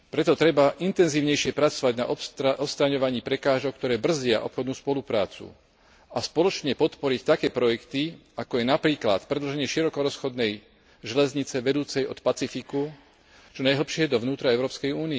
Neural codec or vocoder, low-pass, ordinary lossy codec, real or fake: none; none; none; real